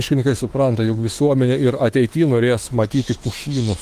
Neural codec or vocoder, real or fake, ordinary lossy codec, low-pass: autoencoder, 48 kHz, 32 numbers a frame, DAC-VAE, trained on Japanese speech; fake; Opus, 24 kbps; 14.4 kHz